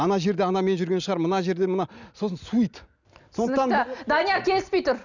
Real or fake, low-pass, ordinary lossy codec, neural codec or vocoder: real; 7.2 kHz; none; none